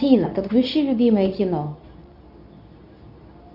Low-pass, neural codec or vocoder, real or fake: 5.4 kHz; codec, 24 kHz, 0.9 kbps, WavTokenizer, medium speech release version 2; fake